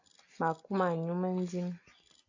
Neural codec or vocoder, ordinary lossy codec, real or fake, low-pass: none; AAC, 48 kbps; real; 7.2 kHz